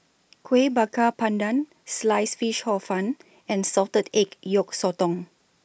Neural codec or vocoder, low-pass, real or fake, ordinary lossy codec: none; none; real; none